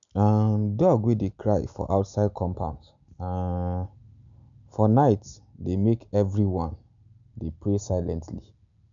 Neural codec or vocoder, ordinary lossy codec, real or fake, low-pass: none; none; real; 7.2 kHz